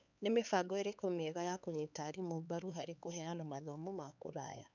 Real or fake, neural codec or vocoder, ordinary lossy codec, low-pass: fake; codec, 16 kHz, 4 kbps, X-Codec, HuBERT features, trained on LibriSpeech; none; 7.2 kHz